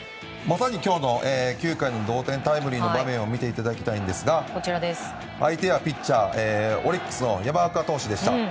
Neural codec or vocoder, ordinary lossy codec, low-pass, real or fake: none; none; none; real